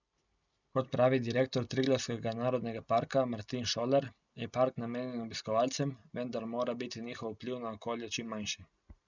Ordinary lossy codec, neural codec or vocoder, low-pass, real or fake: none; none; 7.2 kHz; real